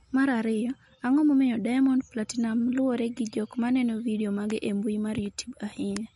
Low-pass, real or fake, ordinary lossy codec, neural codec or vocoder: 19.8 kHz; real; MP3, 48 kbps; none